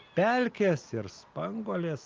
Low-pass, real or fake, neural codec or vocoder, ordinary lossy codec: 7.2 kHz; real; none; Opus, 24 kbps